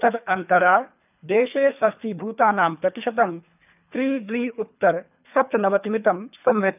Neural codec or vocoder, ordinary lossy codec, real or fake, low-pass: codec, 24 kHz, 3 kbps, HILCodec; none; fake; 3.6 kHz